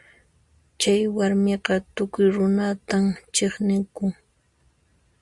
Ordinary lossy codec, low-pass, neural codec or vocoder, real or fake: Opus, 64 kbps; 10.8 kHz; none; real